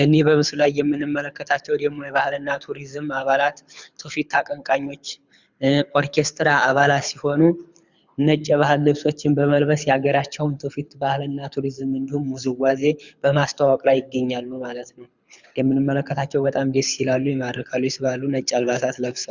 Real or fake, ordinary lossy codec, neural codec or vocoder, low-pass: fake; Opus, 64 kbps; codec, 24 kHz, 6 kbps, HILCodec; 7.2 kHz